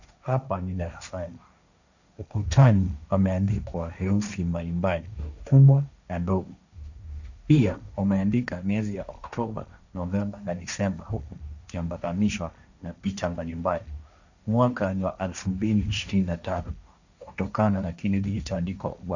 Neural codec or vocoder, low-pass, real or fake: codec, 16 kHz, 1.1 kbps, Voila-Tokenizer; 7.2 kHz; fake